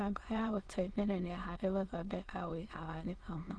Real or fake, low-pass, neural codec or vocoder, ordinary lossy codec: fake; 9.9 kHz; autoencoder, 22.05 kHz, a latent of 192 numbers a frame, VITS, trained on many speakers; Opus, 16 kbps